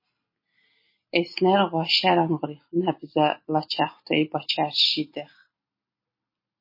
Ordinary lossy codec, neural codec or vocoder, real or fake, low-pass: MP3, 24 kbps; none; real; 5.4 kHz